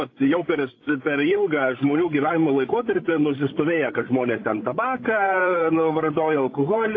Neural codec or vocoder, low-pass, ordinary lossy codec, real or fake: codec, 16 kHz, 16 kbps, FreqCodec, larger model; 7.2 kHz; AAC, 32 kbps; fake